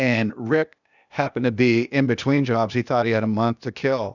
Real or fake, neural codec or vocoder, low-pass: fake; codec, 16 kHz, 0.8 kbps, ZipCodec; 7.2 kHz